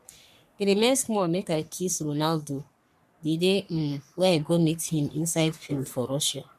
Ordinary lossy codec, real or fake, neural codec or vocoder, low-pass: MP3, 96 kbps; fake; codec, 44.1 kHz, 3.4 kbps, Pupu-Codec; 14.4 kHz